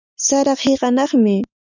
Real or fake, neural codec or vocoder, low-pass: real; none; 7.2 kHz